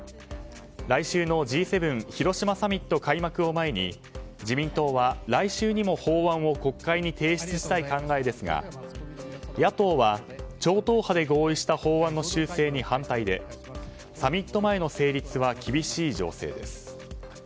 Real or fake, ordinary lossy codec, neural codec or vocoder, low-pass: real; none; none; none